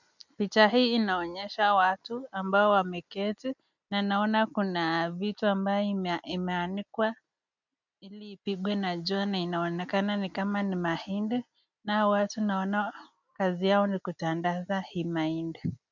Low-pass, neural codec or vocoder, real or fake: 7.2 kHz; none; real